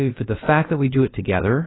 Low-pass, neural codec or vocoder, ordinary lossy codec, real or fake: 7.2 kHz; codec, 16 kHz, about 1 kbps, DyCAST, with the encoder's durations; AAC, 16 kbps; fake